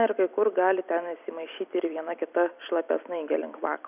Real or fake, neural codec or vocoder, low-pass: fake; vocoder, 44.1 kHz, 128 mel bands every 256 samples, BigVGAN v2; 3.6 kHz